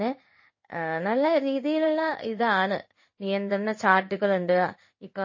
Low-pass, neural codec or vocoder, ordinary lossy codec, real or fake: 7.2 kHz; codec, 16 kHz in and 24 kHz out, 1 kbps, XY-Tokenizer; MP3, 32 kbps; fake